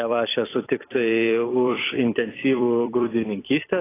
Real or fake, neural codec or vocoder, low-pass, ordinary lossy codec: fake; vocoder, 44.1 kHz, 128 mel bands every 256 samples, BigVGAN v2; 3.6 kHz; AAC, 16 kbps